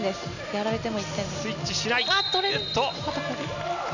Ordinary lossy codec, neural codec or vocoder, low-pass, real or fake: none; none; 7.2 kHz; real